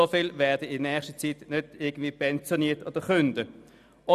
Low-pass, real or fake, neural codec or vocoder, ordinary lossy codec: 14.4 kHz; real; none; none